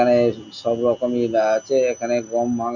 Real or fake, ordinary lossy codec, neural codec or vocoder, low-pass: real; none; none; 7.2 kHz